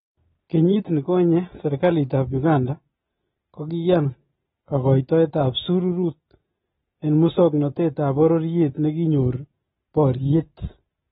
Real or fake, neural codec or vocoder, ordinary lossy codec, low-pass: real; none; AAC, 16 kbps; 19.8 kHz